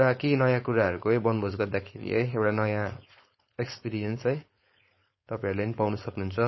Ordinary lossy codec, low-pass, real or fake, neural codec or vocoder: MP3, 24 kbps; 7.2 kHz; fake; codec, 16 kHz, 4.8 kbps, FACodec